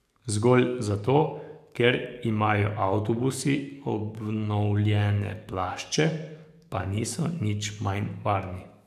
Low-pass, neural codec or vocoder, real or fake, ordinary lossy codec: 14.4 kHz; codec, 44.1 kHz, 7.8 kbps, DAC; fake; none